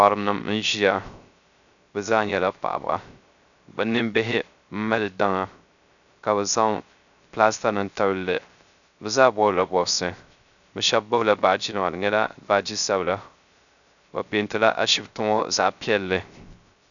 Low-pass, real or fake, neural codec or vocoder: 7.2 kHz; fake; codec, 16 kHz, 0.3 kbps, FocalCodec